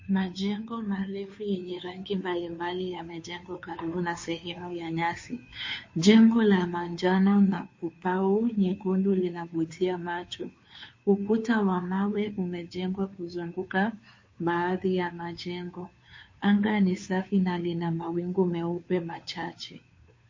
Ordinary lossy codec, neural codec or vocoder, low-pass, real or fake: MP3, 32 kbps; codec, 16 kHz, 2 kbps, FunCodec, trained on Chinese and English, 25 frames a second; 7.2 kHz; fake